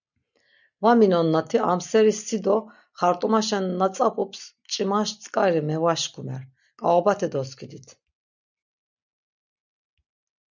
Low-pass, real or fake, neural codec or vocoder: 7.2 kHz; real; none